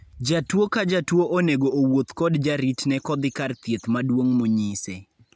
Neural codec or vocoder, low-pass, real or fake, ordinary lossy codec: none; none; real; none